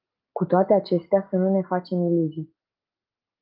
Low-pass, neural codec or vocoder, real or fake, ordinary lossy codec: 5.4 kHz; none; real; Opus, 32 kbps